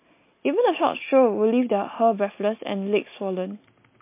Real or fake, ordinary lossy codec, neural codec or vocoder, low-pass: real; MP3, 24 kbps; none; 3.6 kHz